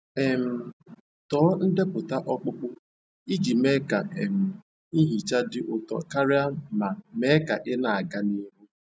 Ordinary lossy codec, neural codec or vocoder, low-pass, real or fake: none; none; none; real